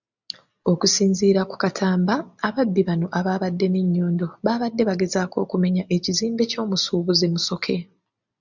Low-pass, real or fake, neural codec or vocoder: 7.2 kHz; real; none